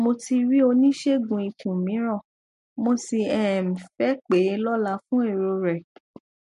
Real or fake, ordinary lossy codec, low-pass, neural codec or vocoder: real; MP3, 48 kbps; 14.4 kHz; none